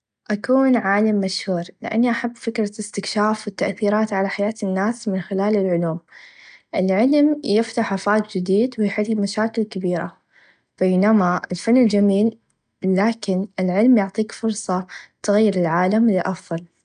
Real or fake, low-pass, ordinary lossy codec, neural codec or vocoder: real; 9.9 kHz; none; none